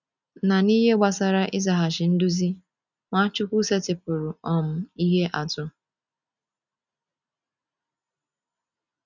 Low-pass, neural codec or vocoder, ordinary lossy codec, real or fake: 7.2 kHz; none; none; real